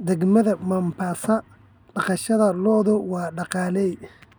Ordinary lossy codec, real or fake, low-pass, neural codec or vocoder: none; real; none; none